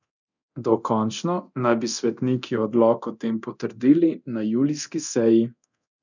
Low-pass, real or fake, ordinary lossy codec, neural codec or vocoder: 7.2 kHz; fake; none; codec, 24 kHz, 0.9 kbps, DualCodec